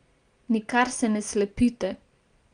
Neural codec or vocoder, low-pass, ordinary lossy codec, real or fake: none; 9.9 kHz; Opus, 24 kbps; real